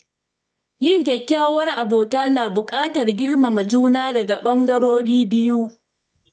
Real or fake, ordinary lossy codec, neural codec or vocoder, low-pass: fake; none; codec, 24 kHz, 0.9 kbps, WavTokenizer, medium music audio release; none